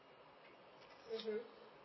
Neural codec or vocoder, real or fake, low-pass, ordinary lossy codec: none; real; 7.2 kHz; MP3, 24 kbps